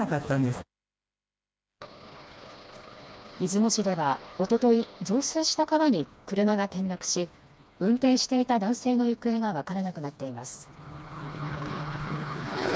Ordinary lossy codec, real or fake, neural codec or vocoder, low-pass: none; fake; codec, 16 kHz, 2 kbps, FreqCodec, smaller model; none